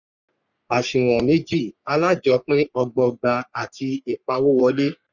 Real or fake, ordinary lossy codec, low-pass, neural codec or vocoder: fake; none; 7.2 kHz; codec, 44.1 kHz, 3.4 kbps, Pupu-Codec